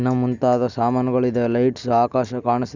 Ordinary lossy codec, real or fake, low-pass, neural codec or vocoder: none; real; 7.2 kHz; none